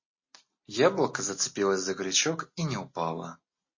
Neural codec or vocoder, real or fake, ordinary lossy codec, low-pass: none; real; MP3, 32 kbps; 7.2 kHz